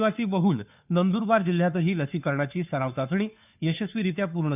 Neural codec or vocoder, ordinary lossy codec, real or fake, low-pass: codec, 16 kHz, 2 kbps, FunCodec, trained on Chinese and English, 25 frames a second; none; fake; 3.6 kHz